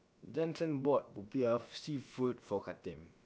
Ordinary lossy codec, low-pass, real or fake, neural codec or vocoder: none; none; fake; codec, 16 kHz, about 1 kbps, DyCAST, with the encoder's durations